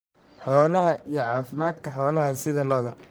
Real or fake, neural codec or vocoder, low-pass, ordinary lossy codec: fake; codec, 44.1 kHz, 1.7 kbps, Pupu-Codec; none; none